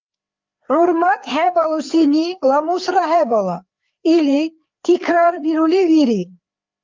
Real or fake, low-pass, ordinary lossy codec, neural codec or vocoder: fake; 7.2 kHz; Opus, 24 kbps; codec, 16 kHz, 4 kbps, FreqCodec, larger model